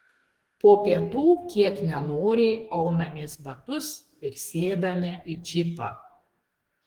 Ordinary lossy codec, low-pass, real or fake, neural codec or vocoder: Opus, 24 kbps; 19.8 kHz; fake; codec, 44.1 kHz, 2.6 kbps, DAC